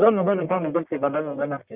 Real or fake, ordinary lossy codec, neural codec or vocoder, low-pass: fake; Opus, 32 kbps; codec, 44.1 kHz, 1.7 kbps, Pupu-Codec; 3.6 kHz